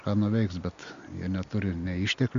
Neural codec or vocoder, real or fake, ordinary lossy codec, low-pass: none; real; MP3, 64 kbps; 7.2 kHz